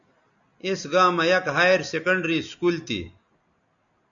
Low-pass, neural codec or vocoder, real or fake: 7.2 kHz; none; real